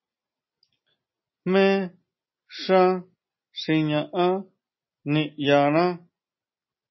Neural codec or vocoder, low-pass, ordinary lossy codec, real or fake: none; 7.2 kHz; MP3, 24 kbps; real